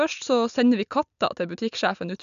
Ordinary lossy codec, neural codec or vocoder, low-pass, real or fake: none; none; 7.2 kHz; real